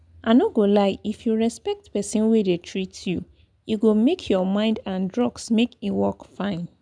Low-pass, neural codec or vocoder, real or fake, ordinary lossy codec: 9.9 kHz; none; real; none